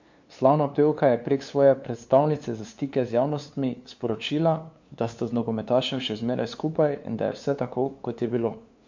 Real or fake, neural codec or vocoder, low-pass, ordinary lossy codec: fake; codec, 16 kHz, 2 kbps, FunCodec, trained on LibriTTS, 25 frames a second; 7.2 kHz; MP3, 48 kbps